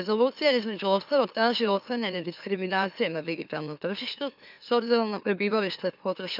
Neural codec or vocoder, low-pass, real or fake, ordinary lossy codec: autoencoder, 44.1 kHz, a latent of 192 numbers a frame, MeloTTS; 5.4 kHz; fake; none